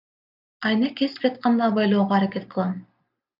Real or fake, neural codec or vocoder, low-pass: real; none; 5.4 kHz